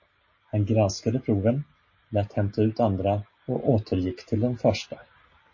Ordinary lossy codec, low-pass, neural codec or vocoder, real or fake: MP3, 32 kbps; 7.2 kHz; none; real